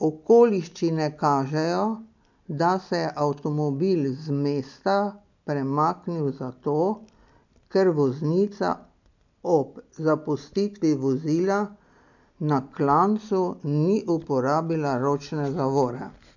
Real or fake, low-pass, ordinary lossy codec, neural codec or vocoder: real; 7.2 kHz; none; none